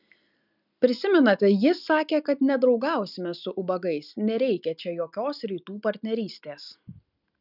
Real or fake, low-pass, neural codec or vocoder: real; 5.4 kHz; none